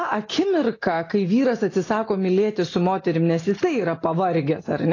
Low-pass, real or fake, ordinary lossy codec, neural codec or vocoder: 7.2 kHz; real; AAC, 32 kbps; none